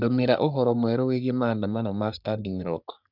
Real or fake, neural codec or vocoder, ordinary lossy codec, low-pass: fake; codec, 44.1 kHz, 3.4 kbps, Pupu-Codec; none; 5.4 kHz